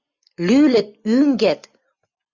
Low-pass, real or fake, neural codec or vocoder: 7.2 kHz; real; none